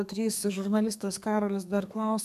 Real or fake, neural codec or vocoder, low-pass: fake; codec, 32 kHz, 1.9 kbps, SNAC; 14.4 kHz